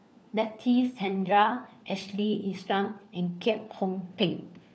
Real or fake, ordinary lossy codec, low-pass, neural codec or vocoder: fake; none; none; codec, 16 kHz, 4 kbps, FunCodec, trained on LibriTTS, 50 frames a second